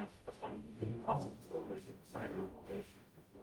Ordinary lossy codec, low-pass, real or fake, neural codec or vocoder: Opus, 32 kbps; 19.8 kHz; fake; codec, 44.1 kHz, 0.9 kbps, DAC